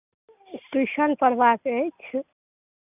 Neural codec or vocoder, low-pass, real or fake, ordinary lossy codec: none; 3.6 kHz; real; none